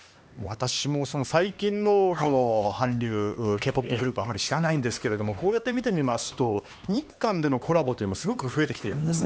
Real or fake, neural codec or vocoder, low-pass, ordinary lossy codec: fake; codec, 16 kHz, 2 kbps, X-Codec, HuBERT features, trained on LibriSpeech; none; none